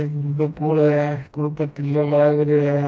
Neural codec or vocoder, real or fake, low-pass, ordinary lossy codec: codec, 16 kHz, 1 kbps, FreqCodec, smaller model; fake; none; none